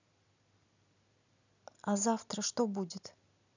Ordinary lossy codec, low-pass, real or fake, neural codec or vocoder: none; 7.2 kHz; real; none